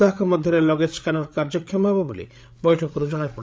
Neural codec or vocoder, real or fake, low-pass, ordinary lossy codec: codec, 16 kHz, 8 kbps, FreqCodec, larger model; fake; none; none